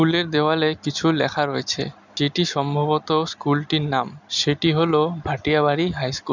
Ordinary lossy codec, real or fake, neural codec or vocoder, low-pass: none; real; none; 7.2 kHz